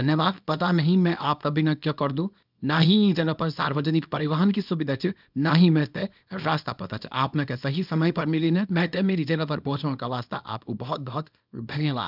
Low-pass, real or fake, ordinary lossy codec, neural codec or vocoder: 5.4 kHz; fake; none; codec, 24 kHz, 0.9 kbps, WavTokenizer, small release